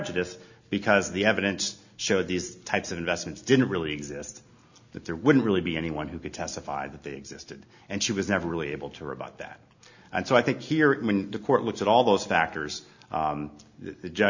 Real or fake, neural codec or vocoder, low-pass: real; none; 7.2 kHz